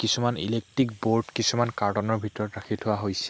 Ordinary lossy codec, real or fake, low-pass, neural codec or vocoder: none; real; none; none